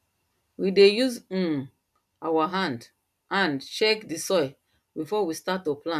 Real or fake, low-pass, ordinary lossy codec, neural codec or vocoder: real; 14.4 kHz; none; none